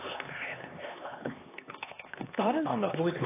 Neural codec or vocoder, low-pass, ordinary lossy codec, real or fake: codec, 16 kHz, 2 kbps, X-Codec, HuBERT features, trained on LibriSpeech; 3.6 kHz; AAC, 24 kbps; fake